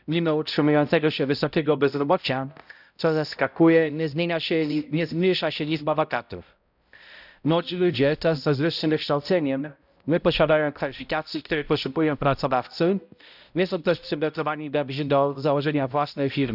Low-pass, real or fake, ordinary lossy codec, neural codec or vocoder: 5.4 kHz; fake; none; codec, 16 kHz, 0.5 kbps, X-Codec, HuBERT features, trained on balanced general audio